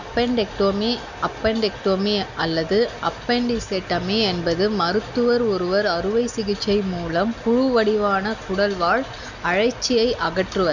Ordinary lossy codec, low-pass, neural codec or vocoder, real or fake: none; 7.2 kHz; none; real